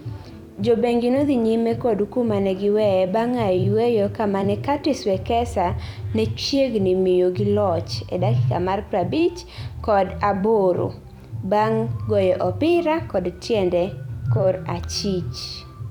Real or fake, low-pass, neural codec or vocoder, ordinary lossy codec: fake; 19.8 kHz; vocoder, 44.1 kHz, 128 mel bands every 256 samples, BigVGAN v2; none